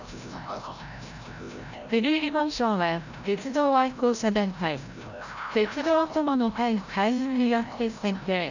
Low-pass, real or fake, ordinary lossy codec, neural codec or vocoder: 7.2 kHz; fake; none; codec, 16 kHz, 0.5 kbps, FreqCodec, larger model